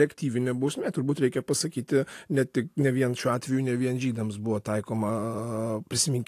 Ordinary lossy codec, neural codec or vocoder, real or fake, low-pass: AAC, 64 kbps; none; real; 14.4 kHz